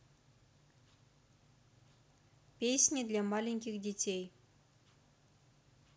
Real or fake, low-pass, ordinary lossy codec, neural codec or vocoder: real; none; none; none